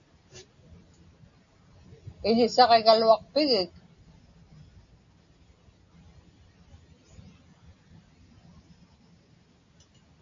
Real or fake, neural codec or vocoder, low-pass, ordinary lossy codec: real; none; 7.2 kHz; AAC, 64 kbps